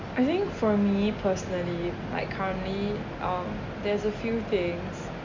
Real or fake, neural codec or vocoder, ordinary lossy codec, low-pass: real; none; MP3, 48 kbps; 7.2 kHz